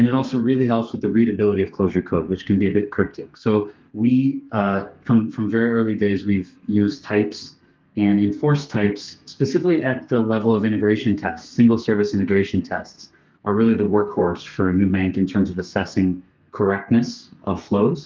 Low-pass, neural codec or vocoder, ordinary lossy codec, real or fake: 7.2 kHz; codec, 32 kHz, 1.9 kbps, SNAC; Opus, 24 kbps; fake